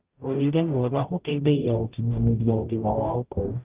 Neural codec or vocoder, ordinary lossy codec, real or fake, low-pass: codec, 44.1 kHz, 0.9 kbps, DAC; Opus, 32 kbps; fake; 3.6 kHz